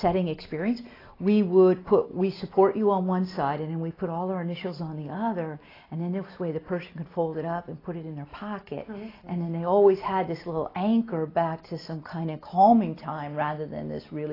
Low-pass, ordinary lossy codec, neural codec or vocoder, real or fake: 5.4 kHz; AAC, 24 kbps; none; real